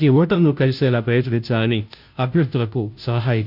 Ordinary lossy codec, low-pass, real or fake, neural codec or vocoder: none; 5.4 kHz; fake; codec, 16 kHz, 0.5 kbps, FunCodec, trained on Chinese and English, 25 frames a second